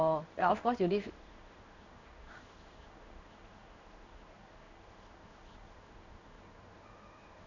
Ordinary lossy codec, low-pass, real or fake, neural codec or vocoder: none; 7.2 kHz; fake; codec, 16 kHz in and 24 kHz out, 1 kbps, XY-Tokenizer